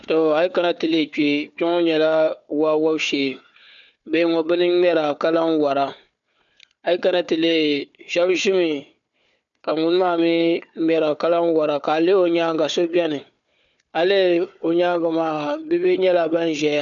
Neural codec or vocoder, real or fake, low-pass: codec, 16 kHz, 4 kbps, FunCodec, trained on Chinese and English, 50 frames a second; fake; 7.2 kHz